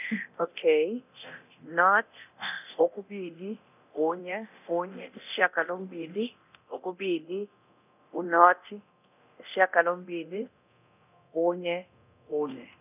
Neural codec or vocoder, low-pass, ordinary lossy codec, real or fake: codec, 24 kHz, 0.9 kbps, DualCodec; 3.6 kHz; none; fake